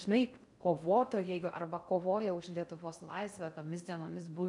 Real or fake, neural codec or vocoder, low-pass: fake; codec, 16 kHz in and 24 kHz out, 0.6 kbps, FocalCodec, streaming, 4096 codes; 10.8 kHz